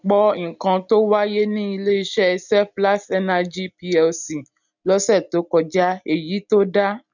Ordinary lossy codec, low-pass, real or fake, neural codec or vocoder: none; 7.2 kHz; real; none